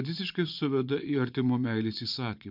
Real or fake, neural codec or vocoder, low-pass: fake; vocoder, 24 kHz, 100 mel bands, Vocos; 5.4 kHz